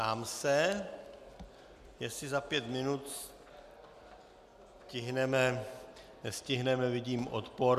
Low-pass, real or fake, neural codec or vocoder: 14.4 kHz; real; none